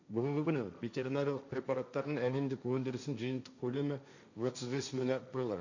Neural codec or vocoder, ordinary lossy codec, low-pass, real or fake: codec, 16 kHz, 1.1 kbps, Voila-Tokenizer; none; 7.2 kHz; fake